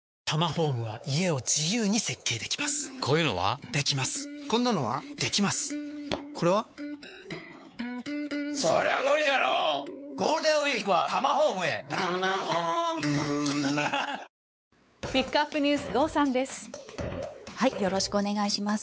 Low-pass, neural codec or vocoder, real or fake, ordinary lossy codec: none; codec, 16 kHz, 4 kbps, X-Codec, WavLM features, trained on Multilingual LibriSpeech; fake; none